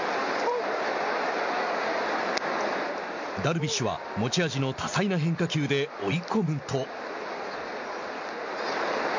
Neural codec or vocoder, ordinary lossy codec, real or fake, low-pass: none; MP3, 48 kbps; real; 7.2 kHz